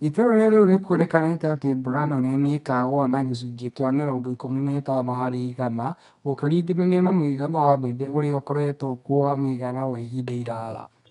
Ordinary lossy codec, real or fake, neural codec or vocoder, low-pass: none; fake; codec, 24 kHz, 0.9 kbps, WavTokenizer, medium music audio release; 10.8 kHz